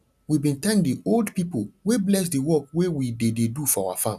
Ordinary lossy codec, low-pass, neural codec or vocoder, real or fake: none; 14.4 kHz; none; real